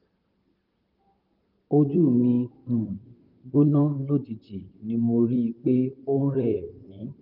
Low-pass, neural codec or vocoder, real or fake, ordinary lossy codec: 5.4 kHz; vocoder, 44.1 kHz, 128 mel bands, Pupu-Vocoder; fake; Opus, 24 kbps